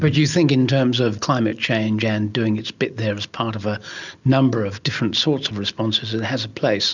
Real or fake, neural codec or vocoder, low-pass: real; none; 7.2 kHz